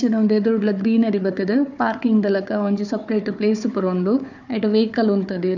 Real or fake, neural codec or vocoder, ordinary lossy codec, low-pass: fake; codec, 16 kHz, 4 kbps, FunCodec, trained on Chinese and English, 50 frames a second; none; 7.2 kHz